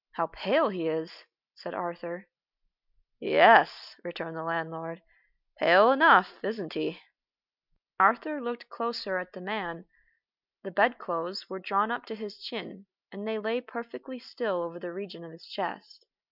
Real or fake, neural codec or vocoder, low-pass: real; none; 5.4 kHz